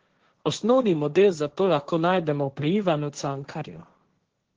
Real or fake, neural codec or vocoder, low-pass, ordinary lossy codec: fake; codec, 16 kHz, 1.1 kbps, Voila-Tokenizer; 7.2 kHz; Opus, 16 kbps